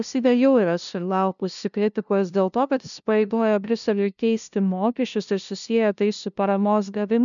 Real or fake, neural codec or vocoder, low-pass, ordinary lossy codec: fake; codec, 16 kHz, 0.5 kbps, FunCodec, trained on LibriTTS, 25 frames a second; 7.2 kHz; MP3, 96 kbps